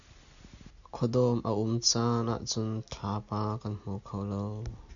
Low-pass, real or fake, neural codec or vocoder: 7.2 kHz; real; none